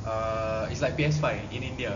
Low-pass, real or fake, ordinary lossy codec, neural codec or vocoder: 7.2 kHz; real; none; none